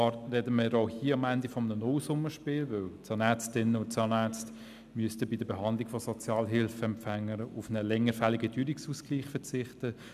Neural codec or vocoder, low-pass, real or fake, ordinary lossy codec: none; 14.4 kHz; real; none